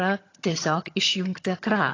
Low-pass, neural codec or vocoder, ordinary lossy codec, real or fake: 7.2 kHz; vocoder, 22.05 kHz, 80 mel bands, HiFi-GAN; AAC, 32 kbps; fake